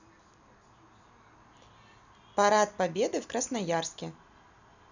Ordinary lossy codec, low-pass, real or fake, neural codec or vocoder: none; 7.2 kHz; real; none